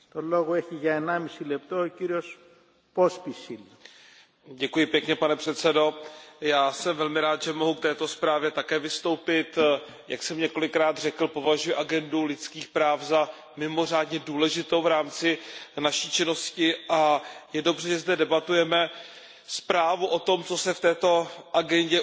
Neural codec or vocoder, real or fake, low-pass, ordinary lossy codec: none; real; none; none